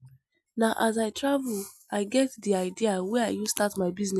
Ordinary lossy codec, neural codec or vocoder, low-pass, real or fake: none; none; none; real